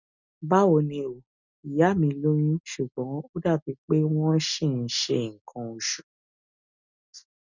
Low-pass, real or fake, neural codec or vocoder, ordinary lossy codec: 7.2 kHz; real; none; none